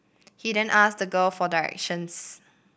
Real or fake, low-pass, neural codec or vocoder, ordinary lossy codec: real; none; none; none